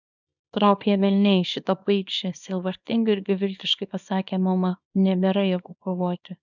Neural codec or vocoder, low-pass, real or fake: codec, 24 kHz, 0.9 kbps, WavTokenizer, small release; 7.2 kHz; fake